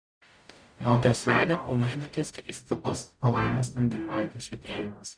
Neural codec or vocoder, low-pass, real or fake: codec, 44.1 kHz, 0.9 kbps, DAC; 9.9 kHz; fake